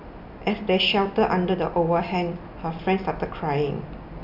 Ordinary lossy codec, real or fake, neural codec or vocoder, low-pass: none; real; none; 5.4 kHz